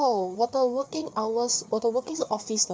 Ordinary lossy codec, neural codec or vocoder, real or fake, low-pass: none; codec, 16 kHz, 4 kbps, FreqCodec, larger model; fake; none